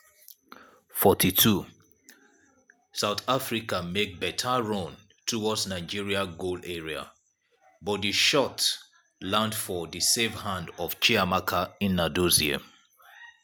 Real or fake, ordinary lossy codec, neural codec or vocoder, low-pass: real; none; none; none